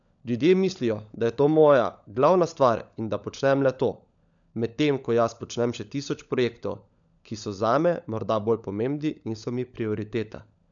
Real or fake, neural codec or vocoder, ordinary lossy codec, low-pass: fake; codec, 16 kHz, 16 kbps, FunCodec, trained on LibriTTS, 50 frames a second; none; 7.2 kHz